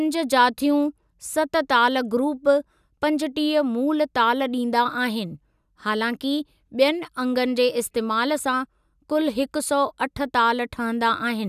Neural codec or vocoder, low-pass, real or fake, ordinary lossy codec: none; 14.4 kHz; real; none